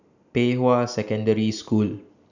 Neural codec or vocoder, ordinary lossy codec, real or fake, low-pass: none; none; real; 7.2 kHz